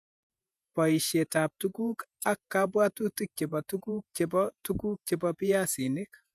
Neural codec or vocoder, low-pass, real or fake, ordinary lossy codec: vocoder, 48 kHz, 128 mel bands, Vocos; 14.4 kHz; fake; none